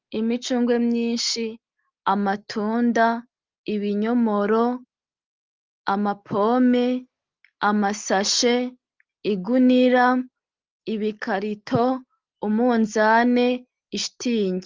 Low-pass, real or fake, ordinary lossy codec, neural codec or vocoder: 7.2 kHz; real; Opus, 32 kbps; none